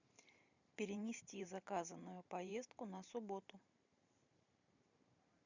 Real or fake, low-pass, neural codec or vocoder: fake; 7.2 kHz; vocoder, 44.1 kHz, 128 mel bands every 512 samples, BigVGAN v2